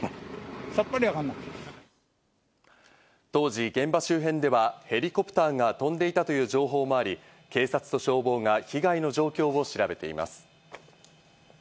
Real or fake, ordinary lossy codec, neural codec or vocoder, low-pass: real; none; none; none